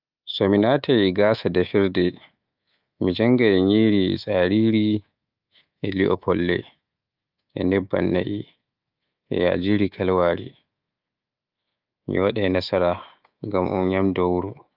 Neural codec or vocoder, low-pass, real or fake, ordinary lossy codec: codec, 24 kHz, 3.1 kbps, DualCodec; 5.4 kHz; fake; Opus, 24 kbps